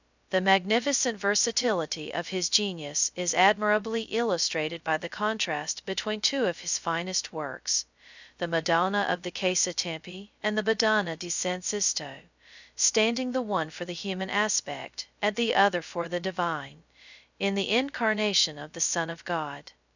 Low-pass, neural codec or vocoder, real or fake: 7.2 kHz; codec, 16 kHz, 0.2 kbps, FocalCodec; fake